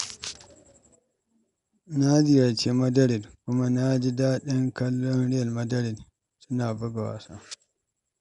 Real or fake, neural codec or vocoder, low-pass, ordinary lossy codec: real; none; 10.8 kHz; none